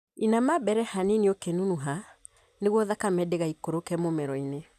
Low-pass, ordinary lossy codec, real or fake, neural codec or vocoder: 14.4 kHz; none; real; none